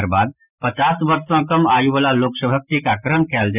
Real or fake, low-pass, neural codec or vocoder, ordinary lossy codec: real; 3.6 kHz; none; none